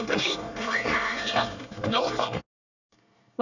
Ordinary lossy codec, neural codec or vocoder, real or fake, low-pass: none; codec, 24 kHz, 1 kbps, SNAC; fake; 7.2 kHz